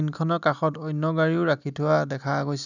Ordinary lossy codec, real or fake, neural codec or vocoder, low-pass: none; real; none; 7.2 kHz